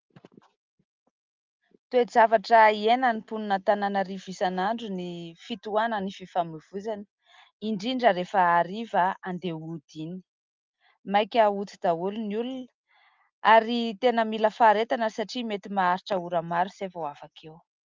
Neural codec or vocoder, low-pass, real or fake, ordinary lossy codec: none; 7.2 kHz; real; Opus, 32 kbps